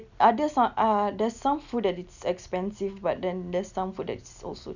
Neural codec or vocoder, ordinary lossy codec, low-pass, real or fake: none; none; 7.2 kHz; real